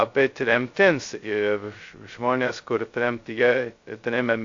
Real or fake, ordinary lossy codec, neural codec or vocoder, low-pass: fake; AAC, 48 kbps; codec, 16 kHz, 0.2 kbps, FocalCodec; 7.2 kHz